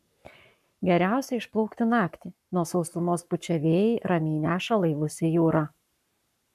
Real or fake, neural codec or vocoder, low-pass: fake; codec, 44.1 kHz, 7.8 kbps, Pupu-Codec; 14.4 kHz